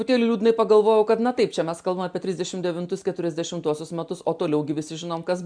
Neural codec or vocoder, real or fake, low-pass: none; real; 9.9 kHz